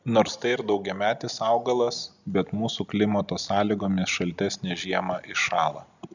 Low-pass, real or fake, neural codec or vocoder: 7.2 kHz; real; none